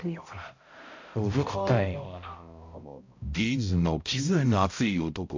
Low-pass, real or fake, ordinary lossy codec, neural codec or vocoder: 7.2 kHz; fake; MP3, 48 kbps; codec, 16 kHz, 0.5 kbps, X-Codec, HuBERT features, trained on balanced general audio